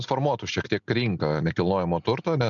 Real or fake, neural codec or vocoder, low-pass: real; none; 7.2 kHz